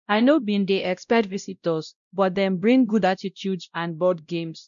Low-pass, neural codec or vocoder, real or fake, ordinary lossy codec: 7.2 kHz; codec, 16 kHz, 0.5 kbps, X-Codec, WavLM features, trained on Multilingual LibriSpeech; fake; none